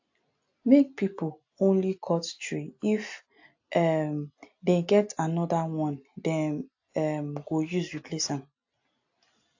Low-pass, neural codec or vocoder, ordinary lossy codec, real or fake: 7.2 kHz; none; AAC, 48 kbps; real